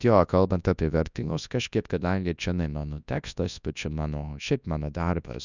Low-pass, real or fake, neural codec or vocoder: 7.2 kHz; fake; codec, 24 kHz, 0.9 kbps, WavTokenizer, large speech release